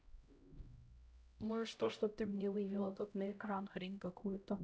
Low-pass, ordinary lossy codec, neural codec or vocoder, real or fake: none; none; codec, 16 kHz, 0.5 kbps, X-Codec, HuBERT features, trained on LibriSpeech; fake